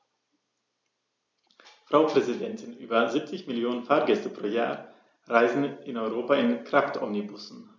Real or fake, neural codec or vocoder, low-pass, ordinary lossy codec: real; none; none; none